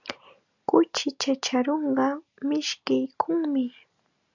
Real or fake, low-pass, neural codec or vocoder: real; 7.2 kHz; none